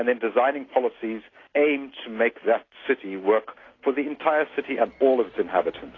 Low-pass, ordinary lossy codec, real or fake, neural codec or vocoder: 7.2 kHz; AAC, 32 kbps; real; none